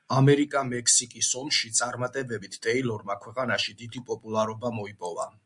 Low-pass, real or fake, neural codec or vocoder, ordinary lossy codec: 10.8 kHz; real; none; MP3, 64 kbps